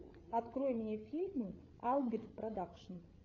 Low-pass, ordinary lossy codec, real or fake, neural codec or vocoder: 7.2 kHz; AAC, 32 kbps; fake; codec, 16 kHz, 8 kbps, FreqCodec, larger model